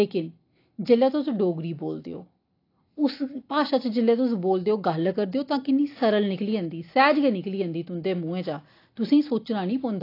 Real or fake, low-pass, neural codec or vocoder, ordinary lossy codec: real; 5.4 kHz; none; AAC, 32 kbps